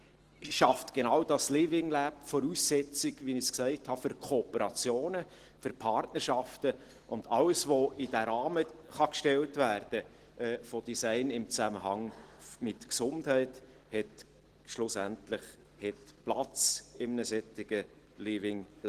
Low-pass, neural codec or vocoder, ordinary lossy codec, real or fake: 14.4 kHz; none; Opus, 16 kbps; real